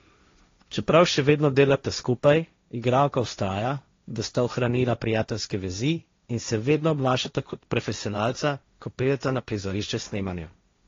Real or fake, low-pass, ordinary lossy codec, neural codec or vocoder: fake; 7.2 kHz; AAC, 32 kbps; codec, 16 kHz, 1.1 kbps, Voila-Tokenizer